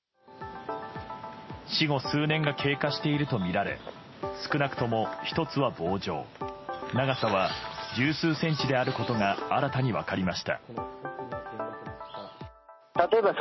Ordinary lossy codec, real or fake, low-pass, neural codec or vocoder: MP3, 24 kbps; real; 7.2 kHz; none